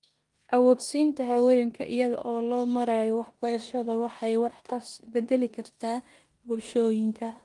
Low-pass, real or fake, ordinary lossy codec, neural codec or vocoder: 10.8 kHz; fake; Opus, 32 kbps; codec, 16 kHz in and 24 kHz out, 0.9 kbps, LongCat-Audio-Codec, four codebook decoder